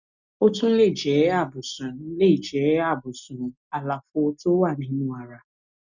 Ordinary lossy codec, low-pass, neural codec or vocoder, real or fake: none; 7.2 kHz; none; real